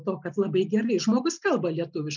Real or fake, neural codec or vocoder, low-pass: real; none; 7.2 kHz